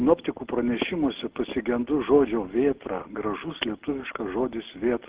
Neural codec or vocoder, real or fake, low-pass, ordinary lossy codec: none; real; 3.6 kHz; Opus, 16 kbps